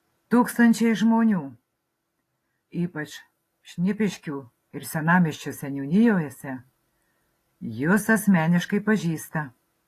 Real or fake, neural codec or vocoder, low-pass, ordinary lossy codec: real; none; 14.4 kHz; AAC, 48 kbps